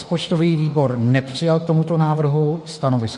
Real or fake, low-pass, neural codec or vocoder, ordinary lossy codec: fake; 14.4 kHz; autoencoder, 48 kHz, 32 numbers a frame, DAC-VAE, trained on Japanese speech; MP3, 48 kbps